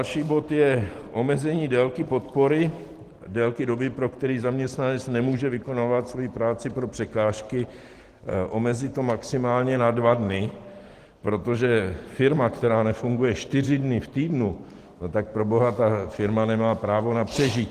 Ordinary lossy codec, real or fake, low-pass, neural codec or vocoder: Opus, 16 kbps; real; 14.4 kHz; none